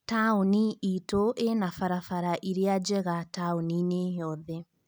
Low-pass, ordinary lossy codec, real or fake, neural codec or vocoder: none; none; real; none